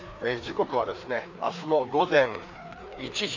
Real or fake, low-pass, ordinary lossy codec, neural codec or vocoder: fake; 7.2 kHz; MP3, 64 kbps; codec, 16 kHz, 2 kbps, FreqCodec, larger model